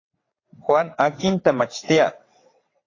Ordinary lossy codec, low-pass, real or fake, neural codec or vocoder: AAC, 32 kbps; 7.2 kHz; fake; vocoder, 22.05 kHz, 80 mel bands, WaveNeXt